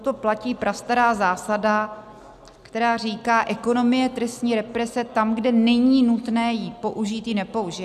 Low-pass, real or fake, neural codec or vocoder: 14.4 kHz; real; none